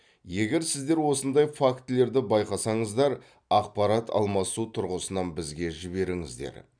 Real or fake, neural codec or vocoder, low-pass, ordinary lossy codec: real; none; 9.9 kHz; none